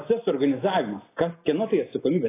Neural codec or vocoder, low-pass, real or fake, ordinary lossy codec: none; 3.6 kHz; real; AAC, 16 kbps